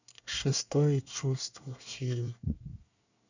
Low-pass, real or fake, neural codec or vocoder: 7.2 kHz; fake; codec, 24 kHz, 1 kbps, SNAC